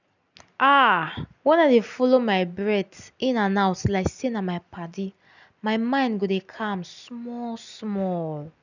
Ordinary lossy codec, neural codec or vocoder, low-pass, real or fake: none; none; 7.2 kHz; real